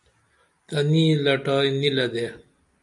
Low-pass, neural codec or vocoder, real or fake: 10.8 kHz; none; real